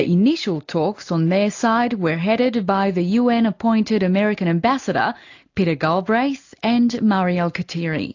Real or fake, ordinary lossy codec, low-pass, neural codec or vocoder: real; AAC, 48 kbps; 7.2 kHz; none